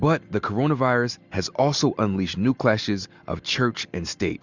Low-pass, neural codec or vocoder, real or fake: 7.2 kHz; none; real